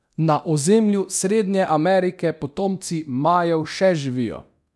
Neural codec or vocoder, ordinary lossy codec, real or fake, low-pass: codec, 24 kHz, 0.9 kbps, DualCodec; none; fake; none